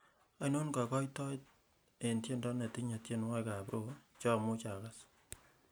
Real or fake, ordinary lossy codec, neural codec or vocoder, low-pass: real; none; none; none